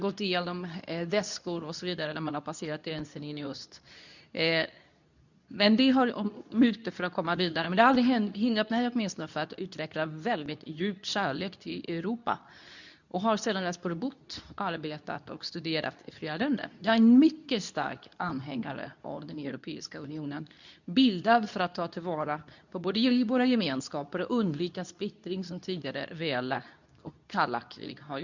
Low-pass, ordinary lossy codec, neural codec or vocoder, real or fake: 7.2 kHz; none; codec, 24 kHz, 0.9 kbps, WavTokenizer, medium speech release version 2; fake